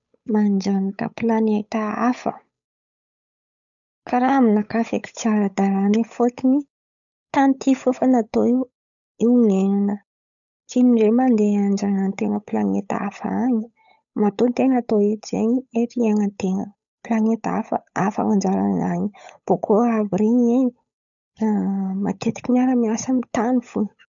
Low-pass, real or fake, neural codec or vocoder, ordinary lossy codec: 7.2 kHz; fake; codec, 16 kHz, 8 kbps, FunCodec, trained on Chinese and English, 25 frames a second; none